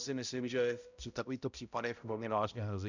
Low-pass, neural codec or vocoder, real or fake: 7.2 kHz; codec, 16 kHz, 0.5 kbps, X-Codec, HuBERT features, trained on balanced general audio; fake